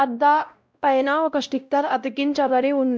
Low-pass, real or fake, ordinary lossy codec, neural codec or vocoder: none; fake; none; codec, 16 kHz, 0.5 kbps, X-Codec, WavLM features, trained on Multilingual LibriSpeech